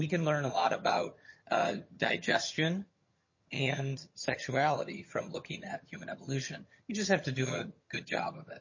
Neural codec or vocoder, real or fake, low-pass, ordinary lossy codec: vocoder, 22.05 kHz, 80 mel bands, HiFi-GAN; fake; 7.2 kHz; MP3, 32 kbps